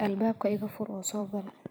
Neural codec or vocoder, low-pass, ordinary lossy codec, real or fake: vocoder, 44.1 kHz, 128 mel bands every 256 samples, BigVGAN v2; none; none; fake